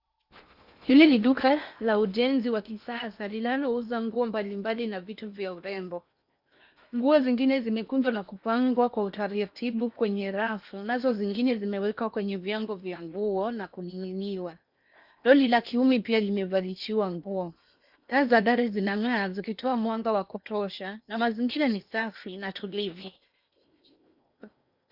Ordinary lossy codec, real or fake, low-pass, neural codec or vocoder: Opus, 64 kbps; fake; 5.4 kHz; codec, 16 kHz in and 24 kHz out, 0.8 kbps, FocalCodec, streaming, 65536 codes